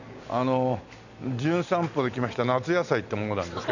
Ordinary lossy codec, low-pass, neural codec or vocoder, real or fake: none; 7.2 kHz; none; real